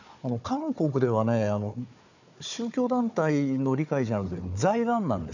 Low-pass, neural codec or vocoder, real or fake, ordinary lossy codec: 7.2 kHz; codec, 16 kHz, 4 kbps, FunCodec, trained on Chinese and English, 50 frames a second; fake; none